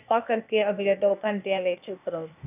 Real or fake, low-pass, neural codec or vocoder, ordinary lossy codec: fake; 3.6 kHz; codec, 16 kHz, 0.8 kbps, ZipCodec; none